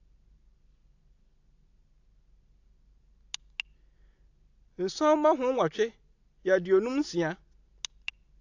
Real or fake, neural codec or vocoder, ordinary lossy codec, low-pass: real; none; AAC, 48 kbps; 7.2 kHz